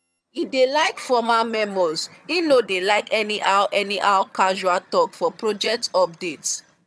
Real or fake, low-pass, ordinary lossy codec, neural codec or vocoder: fake; none; none; vocoder, 22.05 kHz, 80 mel bands, HiFi-GAN